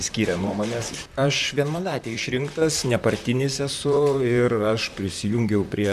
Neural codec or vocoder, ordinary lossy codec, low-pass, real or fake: vocoder, 44.1 kHz, 128 mel bands, Pupu-Vocoder; MP3, 96 kbps; 14.4 kHz; fake